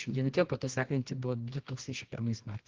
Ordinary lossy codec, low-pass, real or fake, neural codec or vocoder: Opus, 16 kbps; 7.2 kHz; fake; codec, 24 kHz, 0.9 kbps, WavTokenizer, medium music audio release